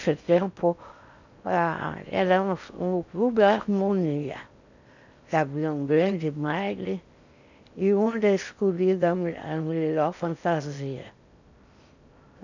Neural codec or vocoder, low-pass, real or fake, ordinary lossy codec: codec, 16 kHz in and 24 kHz out, 0.6 kbps, FocalCodec, streaming, 4096 codes; 7.2 kHz; fake; none